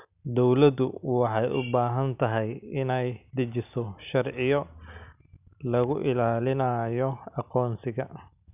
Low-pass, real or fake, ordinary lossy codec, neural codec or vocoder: 3.6 kHz; real; none; none